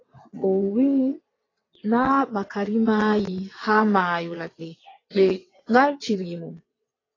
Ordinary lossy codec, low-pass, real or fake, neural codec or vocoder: AAC, 32 kbps; 7.2 kHz; fake; vocoder, 22.05 kHz, 80 mel bands, WaveNeXt